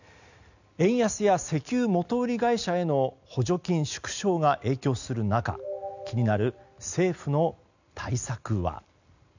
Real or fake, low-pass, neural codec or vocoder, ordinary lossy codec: real; 7.2 kHz; none; none